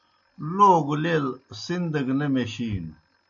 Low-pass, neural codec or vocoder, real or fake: 7.2 kHz; none; real